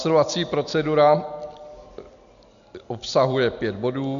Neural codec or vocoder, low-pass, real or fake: none; 7.2 kHz; real